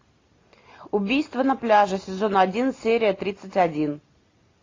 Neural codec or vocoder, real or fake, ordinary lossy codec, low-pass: none; real; AAC, 32 kbps; 7.2 kHz